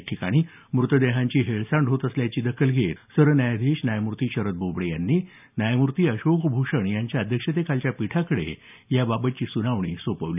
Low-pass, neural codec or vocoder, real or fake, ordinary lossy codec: 3.6 kHz; none; real; none